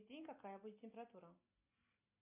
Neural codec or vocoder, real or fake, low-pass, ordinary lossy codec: none; real; 3.6 kHz; MP3, 32 kbps